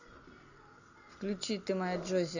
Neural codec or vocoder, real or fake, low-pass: none; real; 7.2 kHz